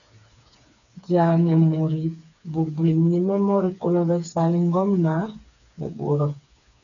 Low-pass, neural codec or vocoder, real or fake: 7.2 kHz; codec, 16 kHz, 4 kbps, FreqCodec, smaller model; fake